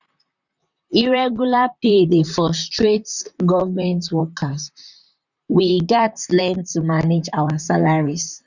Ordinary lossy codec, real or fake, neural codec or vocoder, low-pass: none; fake; vocoder, 44.1 kHz, 128 mel bands, Pupu-Vocoder; 7.2 kHz